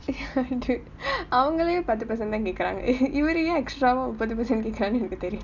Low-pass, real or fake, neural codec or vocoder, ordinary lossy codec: 7.2 kHz; real; none; none